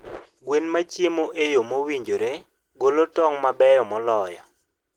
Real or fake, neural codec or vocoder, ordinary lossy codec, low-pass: real; none; Opus, 16 kbps; 19.8 kHz